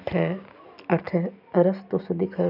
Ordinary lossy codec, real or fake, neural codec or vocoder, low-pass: none; real; none; 5.4 kHz